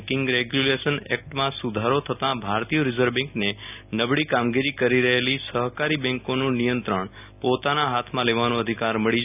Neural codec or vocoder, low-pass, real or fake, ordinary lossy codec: none; 3.6 kHz; real; none